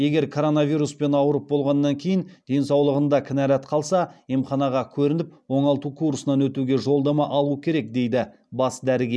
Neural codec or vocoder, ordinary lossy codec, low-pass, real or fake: none; none; none; real